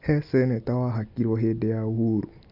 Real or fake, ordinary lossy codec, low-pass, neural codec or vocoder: real; none; 5.4 kHz; none